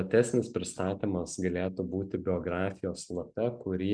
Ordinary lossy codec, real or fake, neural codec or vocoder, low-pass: MP3, 96 kbps; real; none; 9.9 kHz